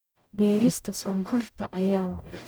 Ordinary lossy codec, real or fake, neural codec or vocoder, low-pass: none; fake; codec, 44.1 kHz, 0.9 kbps, DAC; none